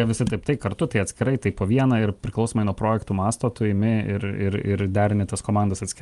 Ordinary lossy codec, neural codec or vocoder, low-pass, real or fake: Opus, 32 kbps; none; 10.8 kHz; real